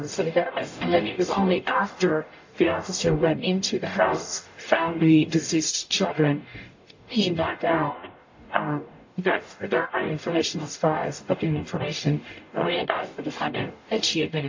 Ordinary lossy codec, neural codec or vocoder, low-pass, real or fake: AAC, 48 kbps; codec, 44.1 kHz, 0.9 kbps, DAC; 7.2 kHz; fake